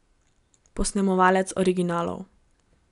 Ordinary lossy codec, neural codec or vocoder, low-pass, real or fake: none; none; 10.8 kHz; real